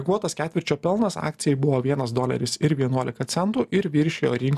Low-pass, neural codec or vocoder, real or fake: 14.4 kHz; none; real